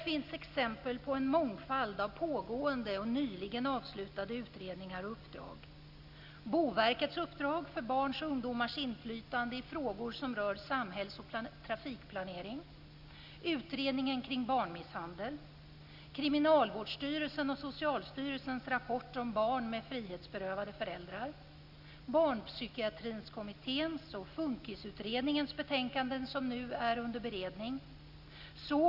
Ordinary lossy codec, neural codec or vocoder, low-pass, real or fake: Opus, 64 kbps; none; 5.4 kHz; real